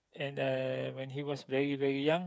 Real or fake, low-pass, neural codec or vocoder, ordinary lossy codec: fake; none; codec, 16 kHz, 8 kbps, FreqCodec, smaller model; none